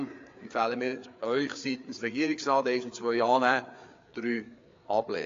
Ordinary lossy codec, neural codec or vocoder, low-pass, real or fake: AAC, 48 kbps; codec, 16 kHz, 4 kbps, FreqCodec, larger model; 7.2 kHz; fake